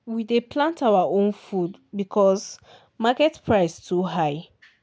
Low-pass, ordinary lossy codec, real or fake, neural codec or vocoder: none; none; real; none